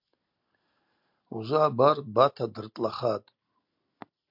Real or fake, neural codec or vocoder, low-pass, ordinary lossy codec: real; none; 5.4 kHz; MP3, 48 kbps